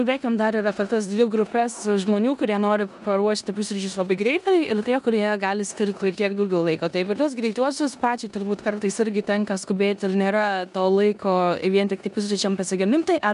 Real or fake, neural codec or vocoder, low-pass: fake; codec, 16 kHz in and 24 kHz out, 0.9 kbps, LongCat-Audio-Codec, four codebook decoder; 10.8 kHz